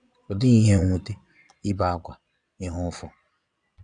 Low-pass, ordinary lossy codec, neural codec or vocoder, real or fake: 9.9 kHz; none; vocoder, 22.05 kHz, 80 mel bands, Vocos; fake